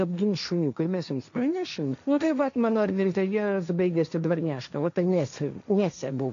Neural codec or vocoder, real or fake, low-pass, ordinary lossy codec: codec, 16 kHz, 1.1 kbps, Voila-Tokenizer; fake; 7.2 kHz; AAC, 96 kbps